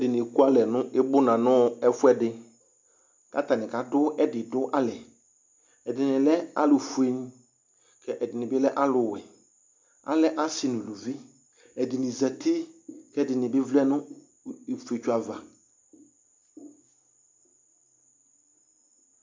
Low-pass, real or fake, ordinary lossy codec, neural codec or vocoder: 7.2 kHz; real; MP3, 64 kbps; none